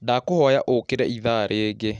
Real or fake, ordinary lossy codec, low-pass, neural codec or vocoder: real; none; 9.9 kHz; none